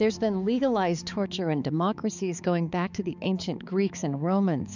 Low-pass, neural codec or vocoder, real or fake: 7.2 kHz; codec, 16 kHz, 4 kbps, X-Codec, HuBERT features, trained on balanced general audio; fake